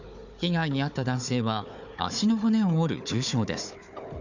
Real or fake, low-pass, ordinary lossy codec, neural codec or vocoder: fake; 7.2 kHz; none; codec, 16 kHz, 4 kbps, FunCodec, trained on Chinese and English, 50 frames a second